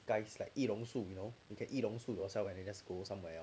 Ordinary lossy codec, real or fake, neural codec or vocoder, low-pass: none; real; none; none